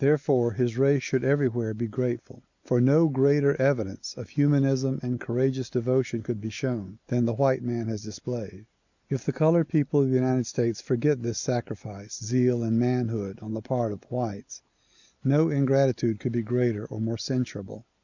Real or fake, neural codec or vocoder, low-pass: real; none; 7.2 kHz